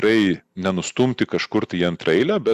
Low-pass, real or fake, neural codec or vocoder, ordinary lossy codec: 14.4 kHz; real; none; Opus, 64 kbps